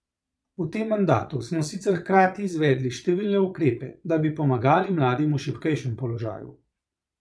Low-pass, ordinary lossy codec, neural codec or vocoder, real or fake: none; none; vocoder, 22.05 kHz, 80 mel bands, Vocos; fake